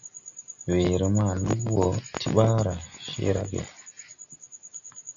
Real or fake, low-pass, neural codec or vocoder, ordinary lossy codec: real; 7.2 kHz; none; MP3, 96 kbps